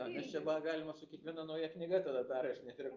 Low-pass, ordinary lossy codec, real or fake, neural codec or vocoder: 7.2 kHz; Opus, 24 kbps; real; none